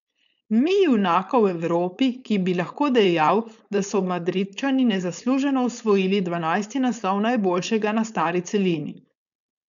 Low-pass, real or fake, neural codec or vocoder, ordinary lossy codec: 7.2 kHz; fake; codec, 16 kHz, 4.8 kbps, FACodec; none